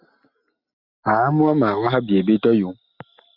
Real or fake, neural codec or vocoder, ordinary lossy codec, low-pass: real; none; Opus, 64 kbps; 5.4 kHz